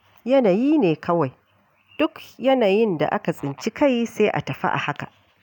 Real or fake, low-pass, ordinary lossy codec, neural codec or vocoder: real; 19.8 kHz; none; none